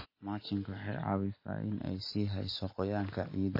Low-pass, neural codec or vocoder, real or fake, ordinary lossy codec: 5.4 kHz; none; real; MP3, 24 kbps